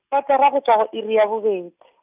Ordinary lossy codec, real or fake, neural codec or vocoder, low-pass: none; real; none; 3.6 kHz